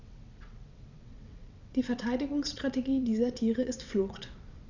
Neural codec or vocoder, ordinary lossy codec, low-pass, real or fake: vocoder, 22.05 kHz, 80 mel bands, WaveNeXt; none; 7.2 kHz; fake